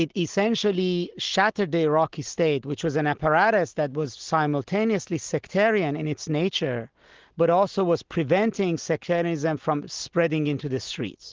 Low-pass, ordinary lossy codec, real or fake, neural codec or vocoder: 7.2 kHz; Opus, 16 kbps; real; none